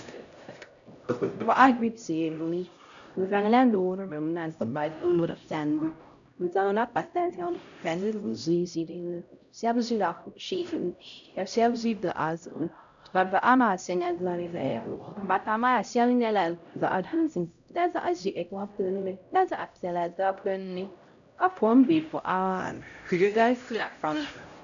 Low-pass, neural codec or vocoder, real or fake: 7.2 kHz; codec, 16 kHz, 0.5 kbps, X-Codec, HuBERT features, trained on LibriSpeech; fake